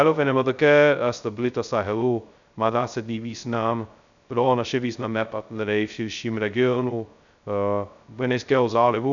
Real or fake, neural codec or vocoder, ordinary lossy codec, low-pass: fake; codec, 16 kHz, 0.2 kbps, FocalCodec; MP3, 96 kbps; 7.2 kHz